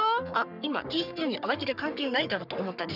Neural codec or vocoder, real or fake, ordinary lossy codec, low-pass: codec, 44.1 kHz, 3.4 kbps, Pupu-Codec; fake; none; 5.4 kHz